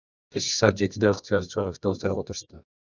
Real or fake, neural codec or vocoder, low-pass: fake; codec, 24 kHz, 0.9 kbps, WavTokenizer, medium music audio release; 7.2 kHz